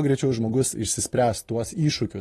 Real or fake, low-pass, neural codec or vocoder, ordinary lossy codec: real; 19.8 kHz; none; AAC, 32 kbps